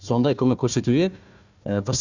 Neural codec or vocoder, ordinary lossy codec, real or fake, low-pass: codec, 16 kHz, 1 kbps, FunCodec, trained on Chinese and English, 50 frames a second; none; fake; 7.2 kHz